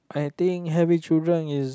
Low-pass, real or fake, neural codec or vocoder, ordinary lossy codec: none; real; none; none